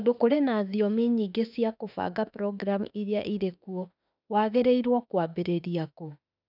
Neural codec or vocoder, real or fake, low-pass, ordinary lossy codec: autoencoder, 48 kHz, 32 numbers a frame, DAC-VAE, trained on Japanese speech; fake; 5.4 kHz; none